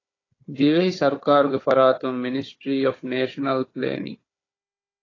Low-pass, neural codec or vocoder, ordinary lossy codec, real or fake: 7.2 kHz; codec, 16 kHz, 4 kbps, FunCodec, trained on Chinese and English, 50 frames a second; AAC, 32 kbps; fake